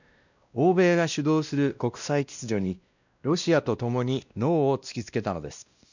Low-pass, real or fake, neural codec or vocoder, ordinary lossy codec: 7.2 kHz; fake; codec, 16 kHz, 1 kbps, X-Codec, WavLM features, trained on Multilingual LibriSpeech; none